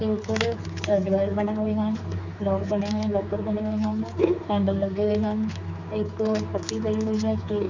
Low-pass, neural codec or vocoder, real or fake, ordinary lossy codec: 7.2 kHz; codec, 16 kHz, 4 kbps, X-Codec, HuBERT features, trained on general audio; fake; none